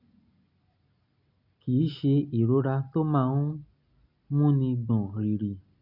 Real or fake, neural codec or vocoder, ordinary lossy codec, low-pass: real; none; none; 5.4 kHz